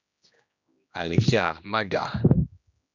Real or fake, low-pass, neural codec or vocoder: fake; 7.2 kHz; codec, 16 kHz, 1 kbps, X-Codec, HuBERT features, trained on general audio